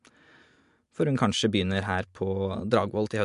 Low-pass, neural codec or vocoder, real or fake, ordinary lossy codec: 10.8 kHz; none; real; MP3, 64 kbps